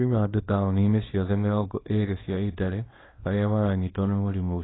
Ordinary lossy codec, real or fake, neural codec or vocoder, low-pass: AAC, 16 kbps; fake; codec, 24 kHz, 0.9 kbps, WavTokenizer, small release; 7.2 kHz